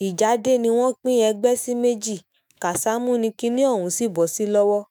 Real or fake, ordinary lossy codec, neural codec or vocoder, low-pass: fake; none; autoencoder, 48 kHz, 128 numbers a frame, DAC-VAE, trained on Japanese speech; none